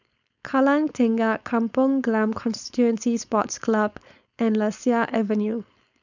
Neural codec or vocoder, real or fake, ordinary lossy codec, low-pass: codec, 16 kHz, 4.8 kbps, FACodec; fake; none; 7.2 kHz